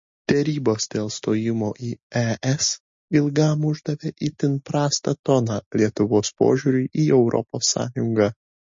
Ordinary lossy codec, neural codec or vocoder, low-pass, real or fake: MP3, 32 kbps; none; 7.2 kHz; real